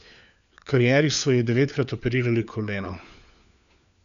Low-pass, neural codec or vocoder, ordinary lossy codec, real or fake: 7.2 kHz; codec, 16 kHz, 4 kbps, FunCodec, trained on LibriTTS, 50 frames a second; none; fake